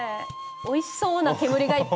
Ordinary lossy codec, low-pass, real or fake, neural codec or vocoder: none; none; real; none